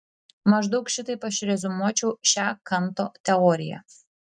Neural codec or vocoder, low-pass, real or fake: none; 9.9 kHz; real